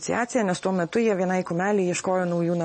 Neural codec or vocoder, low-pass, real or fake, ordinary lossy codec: none; 10.8 kHz; real; MP3, 32 kbps